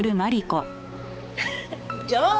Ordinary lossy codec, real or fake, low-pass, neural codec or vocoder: none; fake; none; codec, 16 kHz, 4 kbps, X-Codec, HuBERT features, trained on balanced general audio